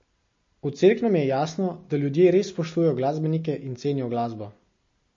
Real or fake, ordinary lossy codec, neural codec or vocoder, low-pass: real; MP3, 32 kbps; none; 7.2 kHz